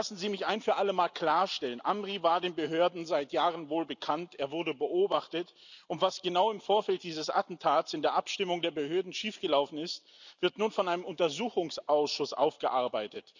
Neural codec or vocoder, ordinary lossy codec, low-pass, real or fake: none; none; 7.2 kHz; real